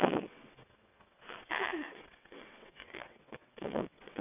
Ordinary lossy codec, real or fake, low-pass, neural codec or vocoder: none; fake; 3.6 kHz; vocoder, 22.05 kHz, 80 mel bands, WaveNeXt